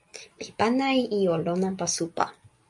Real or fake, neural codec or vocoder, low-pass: real; none; 10.8 kHz